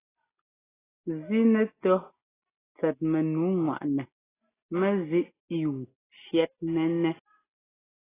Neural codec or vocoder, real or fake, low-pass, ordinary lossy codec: none; real; 3.6 kHz; AAC, 16 kbps